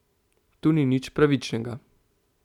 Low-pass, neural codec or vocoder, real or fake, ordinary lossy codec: 19.8 kHz; none; real; none